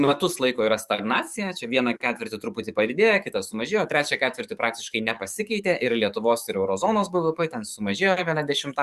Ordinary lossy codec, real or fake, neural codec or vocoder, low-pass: Opus, 64 kbps; fake; codec, 44.1 kHz, 7.8 kbps, DAC; 14.4 kHz